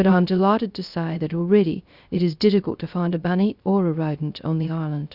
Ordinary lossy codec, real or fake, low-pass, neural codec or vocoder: Opus, 64 kbps; fake; 5.4 kHz; codec, 16 kHz, 0.3 kbps, FocalCodec